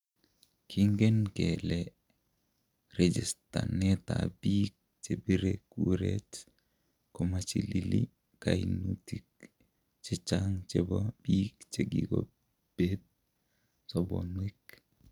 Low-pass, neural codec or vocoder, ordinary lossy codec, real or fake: 19.8 kHz; none; none; real